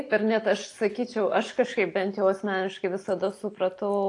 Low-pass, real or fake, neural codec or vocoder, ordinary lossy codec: 10.8 kHz; real; none; AAC, 48 kbps